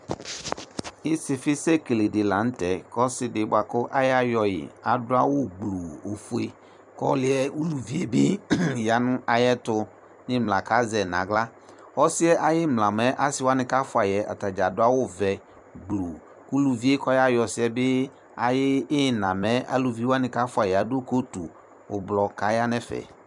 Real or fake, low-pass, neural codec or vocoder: fake; 10.8 kHz; vocoder, 44.1 kHz, 128 mel bands every 256 samples, BigVGAN v2